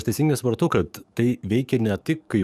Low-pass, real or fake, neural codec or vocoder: 14.4 kHz; fake; codec, 44.1 kHz, 7.8 kbps, DAC